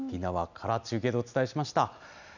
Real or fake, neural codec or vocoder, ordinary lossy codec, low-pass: real; none; none; 7.2 kHz